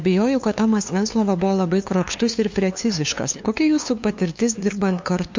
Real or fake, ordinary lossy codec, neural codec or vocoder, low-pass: fake; MP3, 48 kbps; codec, 16 kHz, 2 kbps, FunCodec, trained on LibriTTS, 25 frames a second; 7.2 kHz